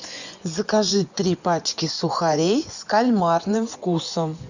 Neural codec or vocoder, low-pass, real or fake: vocoder, 24 kHz, 100 mel bands, Vocos; 7.2 kHz; fake